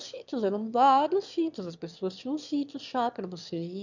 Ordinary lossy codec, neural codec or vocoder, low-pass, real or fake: none; autoencoder, 22.05 kHz, a latent of 192 numbers a frame, VITS, trained on one speaker; 7.2 kHz; fake